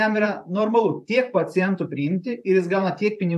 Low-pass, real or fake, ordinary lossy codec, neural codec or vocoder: 14.4 kHz; fake; AAC, 96 kbps; vocoder, 44.1 kHz, 128 mel bands, Pupu-Vocoder